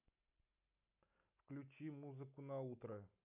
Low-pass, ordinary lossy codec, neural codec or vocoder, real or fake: 3.6 kHz; none; none; real